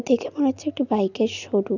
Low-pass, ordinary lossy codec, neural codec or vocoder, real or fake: 7.2 kHz; none; none; real